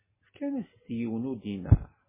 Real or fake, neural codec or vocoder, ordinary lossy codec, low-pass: real; none; MP3, 16 kbps; 3.6 kHz